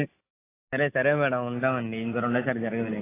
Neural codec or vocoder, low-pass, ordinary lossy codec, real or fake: none; 3.6 kHz; AAC, 16 kbps; real